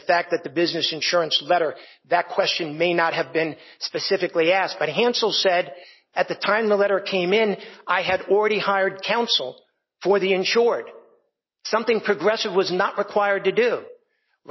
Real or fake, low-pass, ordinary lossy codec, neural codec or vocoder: real; 7.2 kHz; MP3, 24 kbps; none